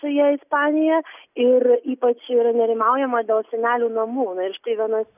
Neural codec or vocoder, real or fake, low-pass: none; real; 3.6 kHz